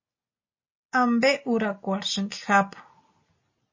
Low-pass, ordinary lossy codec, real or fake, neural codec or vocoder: 7.2 kHz; MP3, 32 kbps; real; none